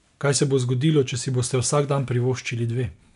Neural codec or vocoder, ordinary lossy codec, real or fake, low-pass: none; none; real; 10.8 kHz